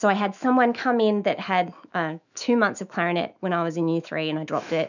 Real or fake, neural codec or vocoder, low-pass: fake; autoencoder, 48 kHz, 128 numbers a frame, DAC-VAE, trained on Japanese speech; 7.2 kHz